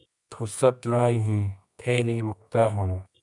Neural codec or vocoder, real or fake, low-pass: codec, 24 kHz, 0.9 kbps, WavTokenizer, medium music audio release; fake; 10.8 kHz